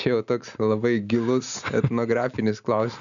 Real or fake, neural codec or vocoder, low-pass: real; none; 7.2 kHz